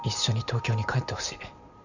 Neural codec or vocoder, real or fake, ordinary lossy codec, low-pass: vocoder, 44.1 kHz, 128 mel bands every 512 samples, BigVGAN v2; fake; none; 7.2 kHz